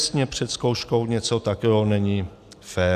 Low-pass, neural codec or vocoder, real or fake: 14.4 kHz; none; real